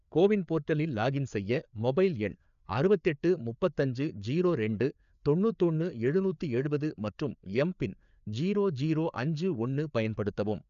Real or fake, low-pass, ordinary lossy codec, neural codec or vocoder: fake; 7.2 kHz; none; codec, 16 kHz, 4 kbps, FreqCodec, larger model